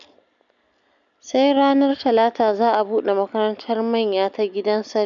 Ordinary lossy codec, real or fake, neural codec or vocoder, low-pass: none; real; none; 7.2 kHz